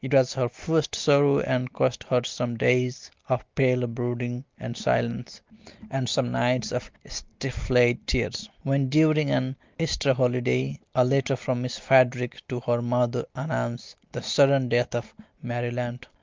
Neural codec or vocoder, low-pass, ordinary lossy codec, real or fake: none; 7.2 kHz; Opus, 32 kbps; real